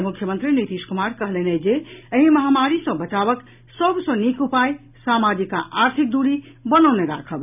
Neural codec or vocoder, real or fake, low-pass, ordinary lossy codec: none; real; 3.6 kHz; none